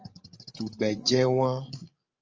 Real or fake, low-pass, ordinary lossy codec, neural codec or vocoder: fake; 7.2 kHz; Opus, 24 kbps; codec, 16 kHz, 16 kbps, FreqCodec, larger model